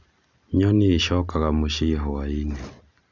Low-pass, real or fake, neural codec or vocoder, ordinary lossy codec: 7.2 kHz; real; none; none